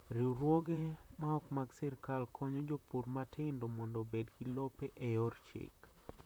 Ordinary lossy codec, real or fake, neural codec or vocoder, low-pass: none; fake; vocoder, 44.1 kHz, 128 mel bands, Pupu-Vocoder; none